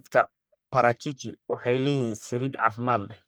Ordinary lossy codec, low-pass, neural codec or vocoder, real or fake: none; none; codec, 44.1 kHz, 1.7 kbps, Pupu-Codec; fake